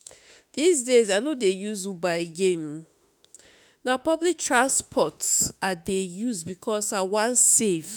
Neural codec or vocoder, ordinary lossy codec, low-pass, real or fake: autoencoder, 48 kHz, 32 numbers a frame, DAC-VAE, trained on Japanese speech; none; none; fake